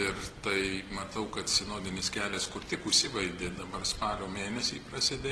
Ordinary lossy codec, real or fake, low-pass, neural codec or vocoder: Opus, 16 kbps; real; 10.8 kHz; none